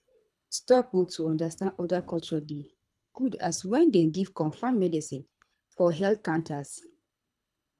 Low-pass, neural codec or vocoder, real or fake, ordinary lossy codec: none; codec, 24 kHz, 3 kbps, HILCodec; fake; none